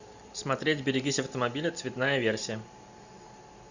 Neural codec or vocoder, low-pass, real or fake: none; 7.2 kHz; real